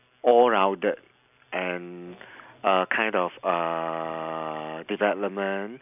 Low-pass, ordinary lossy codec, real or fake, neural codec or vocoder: 3.6 kHz; none; real; none